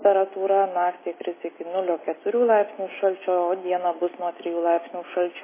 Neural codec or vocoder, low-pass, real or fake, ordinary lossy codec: none; 3.6 kHz; real; MP3, 16 kbps